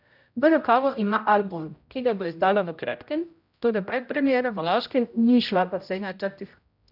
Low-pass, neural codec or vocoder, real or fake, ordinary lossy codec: 5.4 kHz; codec, 16 kHz, 0.5 kbps, X-Codec, HuBERT features, trained on general audio; fake; none